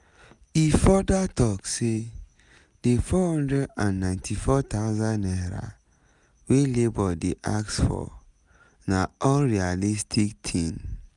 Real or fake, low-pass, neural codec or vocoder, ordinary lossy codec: real; 10.8 kHz; none; none